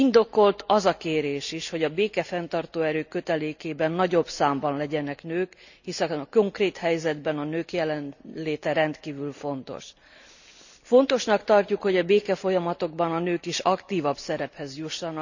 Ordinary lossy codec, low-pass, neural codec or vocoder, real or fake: none; 7.2 kHz; none; real